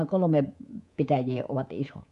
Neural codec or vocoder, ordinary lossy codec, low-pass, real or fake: codec, 24 kHz, 3.1 kbps, DualCodec; none; 10.8 kHz; fake